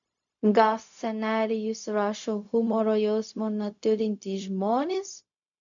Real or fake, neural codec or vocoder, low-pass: fake; codec, 16 kHz, 0.4 kbps, LongCat-Audio-Codec; 7.2 kHz